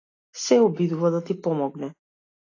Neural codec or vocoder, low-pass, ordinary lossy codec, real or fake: none; 7.2 kHz; AAC, 32 kbps; real